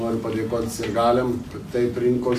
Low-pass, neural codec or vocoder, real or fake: 14.4 kHz; none; real